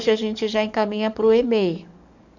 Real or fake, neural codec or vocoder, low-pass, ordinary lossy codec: fake; codec, 44.1 kHz, 7.8 kbps, DAC; 7.2 kHz; none